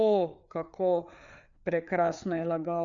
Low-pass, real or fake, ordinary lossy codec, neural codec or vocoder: 7.2 kHz; fake; none; codec, 16 kHz, 4 kbps, FreqCodec, larger model